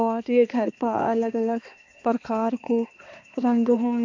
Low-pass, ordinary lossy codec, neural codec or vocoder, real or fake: 7.2 kHz; AAC, 48 kbps; codec, 16 kHz, 2 kbps, X-Codec, HuBERT features, trained on balanced general audio; fake